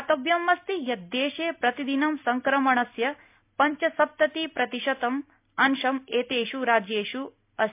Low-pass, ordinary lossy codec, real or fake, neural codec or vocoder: 3.6 kHz; MP3, 32 kbps; real; none